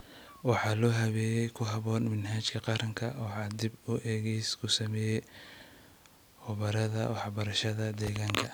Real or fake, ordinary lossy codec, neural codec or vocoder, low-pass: real; none; none; none